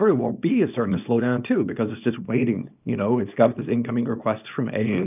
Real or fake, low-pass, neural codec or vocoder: fake; 3.6 kHz; codec, 24 kHz, 0.9 kbps, WavTokenizer, small release